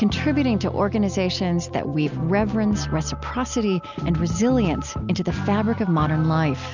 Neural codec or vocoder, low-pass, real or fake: none; 7.2 kHz; real